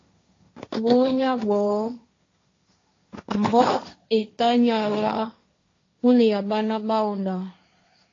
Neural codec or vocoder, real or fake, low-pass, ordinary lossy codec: codec, 16 kHz, 1.1 kbps, Voila-Tokenizer; fake; 7.2 kHz; AAC, 32 kbps